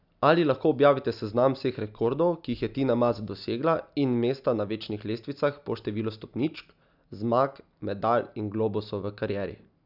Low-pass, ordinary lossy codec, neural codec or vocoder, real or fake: 5.4 kHz; none; none; real